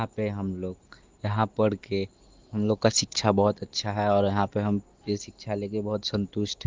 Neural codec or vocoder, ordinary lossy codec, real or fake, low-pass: none; Opus, 16 kbps; real; 7.2 kHz